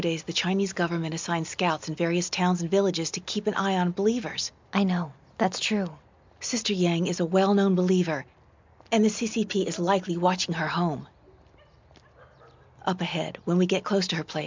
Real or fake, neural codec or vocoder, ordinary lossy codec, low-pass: real; none; MP3, 64 kbps; 7.2 kHz